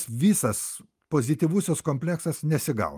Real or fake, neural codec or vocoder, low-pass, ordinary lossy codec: real; none; 14.4 kHz; Opus, 32 kbps